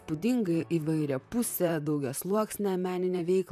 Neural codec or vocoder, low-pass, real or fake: vocoder, 44.1 kHz, 128 mel bands, Pupu-Vocoder; 14.4 kHz; fake